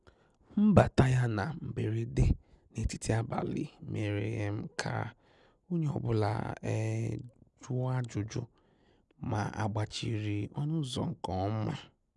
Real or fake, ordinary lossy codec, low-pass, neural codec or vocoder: real; none; 10.8 kHz; none